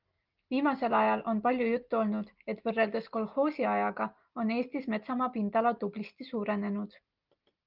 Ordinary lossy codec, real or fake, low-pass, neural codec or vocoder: Opus, 32 kbps; real; 5.4 kHz; none